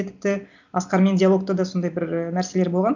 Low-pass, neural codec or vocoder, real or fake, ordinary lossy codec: 7.2 kHz; none; real; none